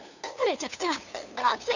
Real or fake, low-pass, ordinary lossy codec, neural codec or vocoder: fake; 7.2 kHz; none; codec, 16 kHz, 2 kbps, FunCodec, trained on LibriTTS, 25 frames a second